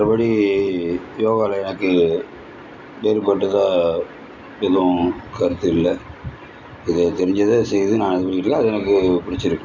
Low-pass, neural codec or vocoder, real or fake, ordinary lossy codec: 7.2 kHz; none; real; none